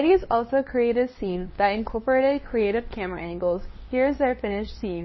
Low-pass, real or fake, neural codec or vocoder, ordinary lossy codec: 7.2 kHz; fake; codec, 16 kHz, 2 kbps, FunCodec, trained on LibriTTS, 25 frames a second; MP3, 24 kbps